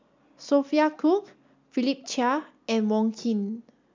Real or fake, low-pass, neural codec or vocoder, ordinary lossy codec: real; 7.2 kHz; none; MP3, 64 kbps